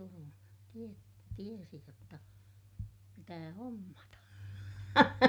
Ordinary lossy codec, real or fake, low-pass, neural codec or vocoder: none; real; none; none